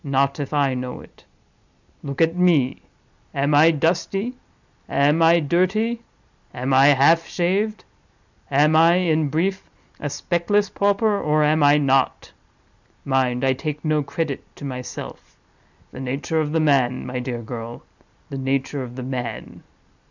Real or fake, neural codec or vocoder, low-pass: real; none; 7.2 kHz